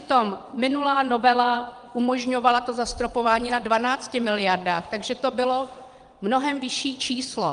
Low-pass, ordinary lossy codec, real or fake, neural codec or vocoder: 9.9 kHz; Opus, 32 kbps; fake; vocoder, 22.05 kHz, 80 mel bands, WaveNeXt